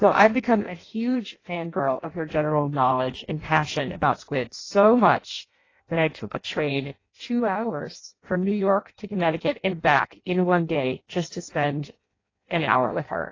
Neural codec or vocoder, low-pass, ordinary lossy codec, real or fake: codec, 16 kHz in and 24 kHz out, 0.6 kbps, FireRedTTS-2 codec; 7.2 kHz; AAC, 32 kbps; fake